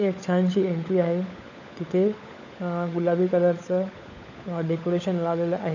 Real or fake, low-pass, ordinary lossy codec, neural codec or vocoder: fake; 7.2 kHz; none; codec, 16 kHz, 16 kbps, FunCodec, trained on LibriTTS, 50 frames a second